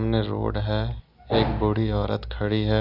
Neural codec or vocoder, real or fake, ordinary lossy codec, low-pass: none; real; none; 5.4 kHz